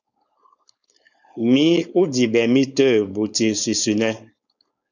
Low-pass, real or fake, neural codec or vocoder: 7.2 kHz; fake; codec, 16 kHz, 4.8 kbps, FACodec